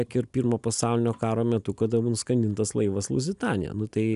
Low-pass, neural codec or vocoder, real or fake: 10.8 kHz; none; real